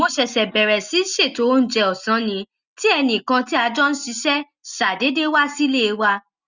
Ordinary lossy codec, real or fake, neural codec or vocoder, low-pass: Opus, 64 kbps; real; none; 7.2 kHz